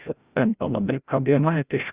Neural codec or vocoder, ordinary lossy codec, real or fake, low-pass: codec, 16 kHz, 0.5 kbps, FreqCodec, larger model; Opus, 64 kbps; fake; 3.6 kHz